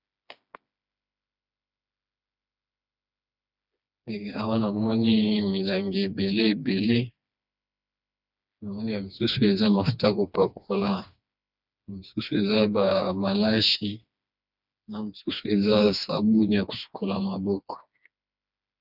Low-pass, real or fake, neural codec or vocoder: 5.4 kHz; fake; codec, 16 kHz, 2 kbps, FreqCodec, smaller model